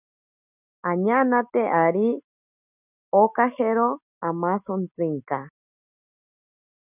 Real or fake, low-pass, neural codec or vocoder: real; 3.6 kHz; none